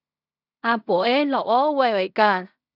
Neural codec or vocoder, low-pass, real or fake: codec, 16 kHz in and 24 kHz out, 0.4 kbps, LongCat-Audio-Codec, fine tuned four codebook decoder; 5.4 kHz; fake